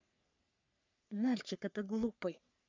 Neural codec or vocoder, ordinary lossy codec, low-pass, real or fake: codec, 44.1 kHz, 3.4 kbps, Pupu-Codec; MP3, 64 kbps; 7.2 kHz; fake